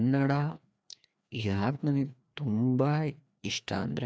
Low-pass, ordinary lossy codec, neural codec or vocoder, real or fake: none; none; codec, 16 kHz, 2 kbps, FreqCodec, larger model; fake